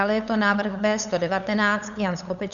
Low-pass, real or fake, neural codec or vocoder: 7.2 kHz; fake; codec, 16 kHz, 8 kbps, FunCodec, trained on LibriTTS, 25 frames a second